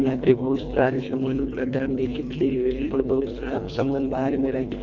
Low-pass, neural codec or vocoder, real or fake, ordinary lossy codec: 7.2 kHz; codec, 24 kHz, 1.5 kbps, HILCodec; fake; none